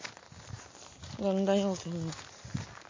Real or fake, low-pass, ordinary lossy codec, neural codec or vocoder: real; 7.2 kHz; MP3, 32 kbps; none